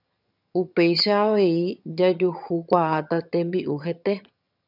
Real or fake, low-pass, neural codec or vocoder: fake; 5.4 kHz; vocoder, 22.05 kHz, 80 mel bands, HiFi-GAN